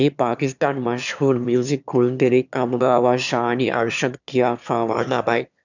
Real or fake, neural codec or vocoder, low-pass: fake; autoencoder, 22.05 kHz, a latent of 192 numbers a frame, VITS, trained on one speaker; 7.2 kHz